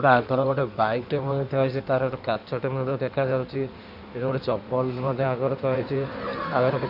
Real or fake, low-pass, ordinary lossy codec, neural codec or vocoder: fake; 5.4 kHz; none; codec, 16 kHz in and 24 kHz out, 1.1 kbps, FireRedTTS-2 codec